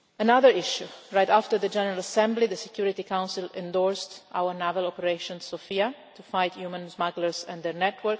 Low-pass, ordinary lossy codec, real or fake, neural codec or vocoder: none; none; real; none